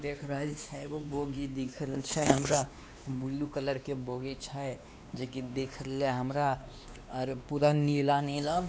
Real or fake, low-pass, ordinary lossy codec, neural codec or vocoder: fake; none; none; codec, 16 kHz, 2 kbps, X-Codec, WavLM features, trained on Multilingual LibriSpeech